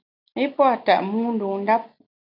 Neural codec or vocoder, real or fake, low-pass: none; real; 5.4 kHz